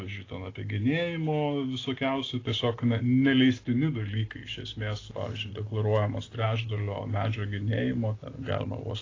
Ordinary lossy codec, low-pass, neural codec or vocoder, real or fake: AAC, 32 kbps; 7.2 kHz; none; real